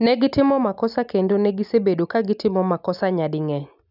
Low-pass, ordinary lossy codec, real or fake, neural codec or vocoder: 5.4 kHz; none; real; none